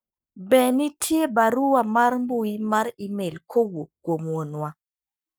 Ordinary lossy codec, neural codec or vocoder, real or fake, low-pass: none; codec, 44.1 kHz, 7.8 kbps, Pupu-Codec; fake; none